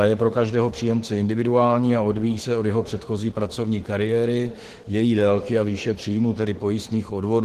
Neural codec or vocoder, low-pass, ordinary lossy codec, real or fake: autoencoder, 48 kHz, 32 numbers a frame, DAC-VAE, trained on Japanese speech; 14.4 kHz; Opus, 16 kbps; fake